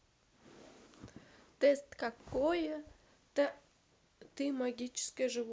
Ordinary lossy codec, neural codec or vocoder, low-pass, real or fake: none; none; none; real